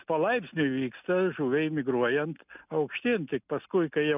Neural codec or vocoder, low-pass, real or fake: none; 3.6 kHz; real